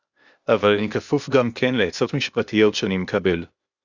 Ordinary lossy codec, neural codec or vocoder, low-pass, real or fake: Opus, 64 kbps; codec, 16 kHz, 0.8 kbps, ZipCodec; 7.2 kHz; fake